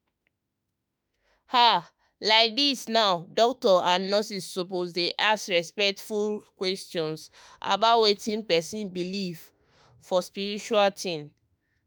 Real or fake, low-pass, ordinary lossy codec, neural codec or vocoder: fake; none; none; autoencoder, 48 kHz, 32 numbers a frame, DAC-VAE, trained on Japanese speech